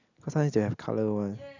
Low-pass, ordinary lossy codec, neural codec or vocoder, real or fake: 7.2 kHz; none; none; real